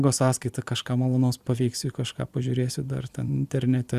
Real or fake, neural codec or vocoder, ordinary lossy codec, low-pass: fake; vocoder, 44.1 kHz, 128 mel bands every 256 samples, BigVGAN v2; Opus, 64 kbps; 14.4 kHz